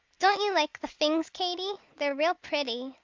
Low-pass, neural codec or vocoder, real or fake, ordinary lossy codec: 7.2 kHz; none; real; Opus, 32 kbps